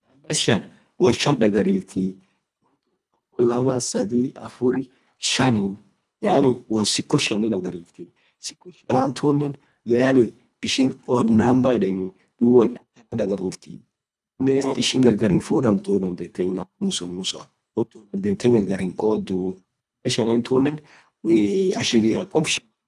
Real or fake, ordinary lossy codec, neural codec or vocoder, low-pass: fake; none; codec, 24 kHz, 1.5 kbps, HILCodec; none